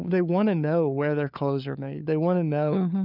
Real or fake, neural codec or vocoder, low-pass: fake; codec, 16 kHz, 4 kbps, FunCodec, trained on LibriTTS, 50 frames a second; 5.4 kHz